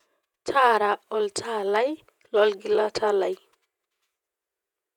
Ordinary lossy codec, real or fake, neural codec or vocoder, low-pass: none; fake; vocoder, 48 kHz, 128 mel bands, Vocos; 19.8 kHz